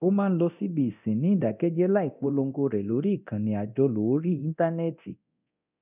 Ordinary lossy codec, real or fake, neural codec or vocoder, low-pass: none; fake; codec, 24 kHz, 0.9 kbps, DualCodec; 3.6 kHz